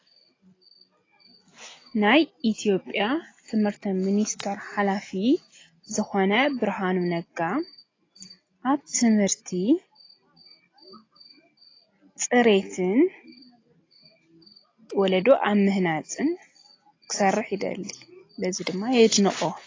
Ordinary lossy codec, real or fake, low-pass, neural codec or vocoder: AAC, 32 kbps; real; 7.2 kHz; none